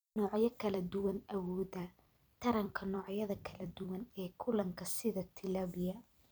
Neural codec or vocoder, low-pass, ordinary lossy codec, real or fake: vocoder, 44.1 kHz, 128 mel bands every 256 samples, BigVGAN v2; none; none; fake